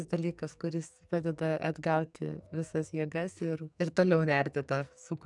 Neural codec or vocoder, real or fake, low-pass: codec, 44.1 kHz, 2.6 kbps, SNAC; fake; 10.8 kHz